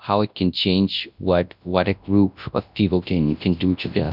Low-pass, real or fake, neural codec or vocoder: 5.4 kHz; fake; codec, 24 kHz, 0.9 kbps, WavTokenizer, large speech release